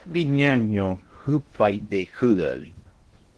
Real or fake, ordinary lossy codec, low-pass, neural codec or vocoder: fake; Opus, 16 kbps; 10.8 kHz; codec, 16 kHz in and 24 kHz out, 0.6 kbps, FocalCodec, streaming, 2048 codes